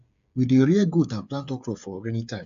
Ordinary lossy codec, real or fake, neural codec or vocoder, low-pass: AAC, 64 kbps; fake; codec, 16 kHz, 8 kbps, FreqCodec, smaller model; 7.2 kHz